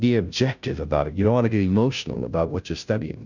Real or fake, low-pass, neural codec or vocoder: fake; 7.2 kHz; codec, 16 kHz, 0.5 kbps, FunCodec, trained on Chinese and English, 25 frames a second